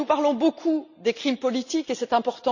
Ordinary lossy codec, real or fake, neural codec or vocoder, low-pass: none; real; none; 7.2 kHz